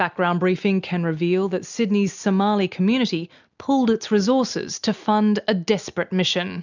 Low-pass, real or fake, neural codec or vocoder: 7.2 kHz; real; none